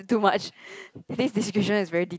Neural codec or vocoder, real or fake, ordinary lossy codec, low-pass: none; real; none; none